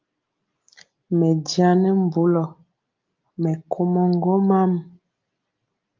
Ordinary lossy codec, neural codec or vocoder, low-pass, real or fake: Opus, 24 kbps; none; 7.2 kHz; real